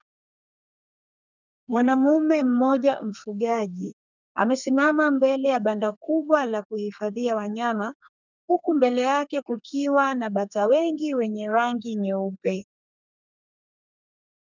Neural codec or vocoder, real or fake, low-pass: codec, 32 kHz, 1.9 kbps, SNAC; fake; 7.2 kHz